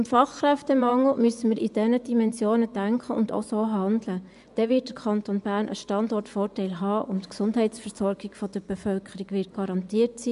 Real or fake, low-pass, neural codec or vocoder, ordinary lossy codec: fake; 10.8 kHz; vocoder, 24 kHz, 100 mel bands, Vocos; none